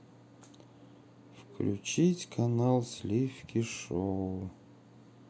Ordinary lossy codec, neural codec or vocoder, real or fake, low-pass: none; none; real; none